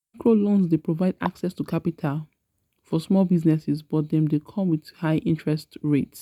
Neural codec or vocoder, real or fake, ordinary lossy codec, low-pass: none; real; none; 19.8 kHz